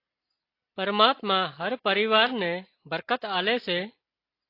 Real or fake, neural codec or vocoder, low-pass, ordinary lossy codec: real; none; 5.4 kHz; AAC, 32 kbps